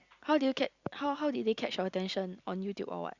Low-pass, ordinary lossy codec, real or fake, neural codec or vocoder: 7.2 kHz; Opus, 64 kbps; real; none